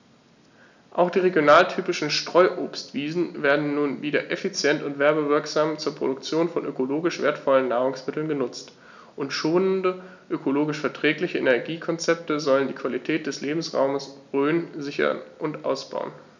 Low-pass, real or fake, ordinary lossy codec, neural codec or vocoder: 7.2 kHz; real; none; none